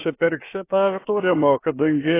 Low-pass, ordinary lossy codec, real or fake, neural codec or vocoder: 3.6 kHz; AAC, 24 kbps; fake; codec, 16 kHz, about 1 kbps, DyCAST, with the encoder's durations